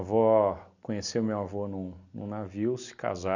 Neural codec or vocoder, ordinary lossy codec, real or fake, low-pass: none; none; real; 7.2 kHz